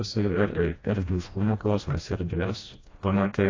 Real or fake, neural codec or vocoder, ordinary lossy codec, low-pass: fake; codec, 16 kHz, 1 kbps, FreqCodec, smaller model; AAC, 32 kbps; 7.2 kHz